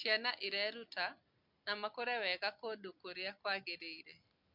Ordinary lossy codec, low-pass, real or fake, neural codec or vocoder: MP3, 48 kbps; 5.4 kHz; real; none